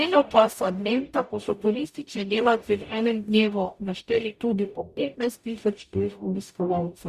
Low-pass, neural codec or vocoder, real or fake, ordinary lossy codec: 14.4 kHz; codec, 44.1 kHz, 0.9 kbps, DAC; fake; Opus, 64 kbps